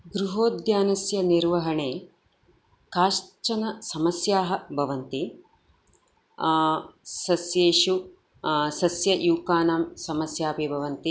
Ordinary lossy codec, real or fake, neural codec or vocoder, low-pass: none; real; none; none